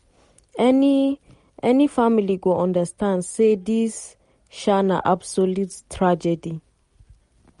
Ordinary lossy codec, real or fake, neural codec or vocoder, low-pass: MP3, 48 kbps; real; none; 10.8 kHz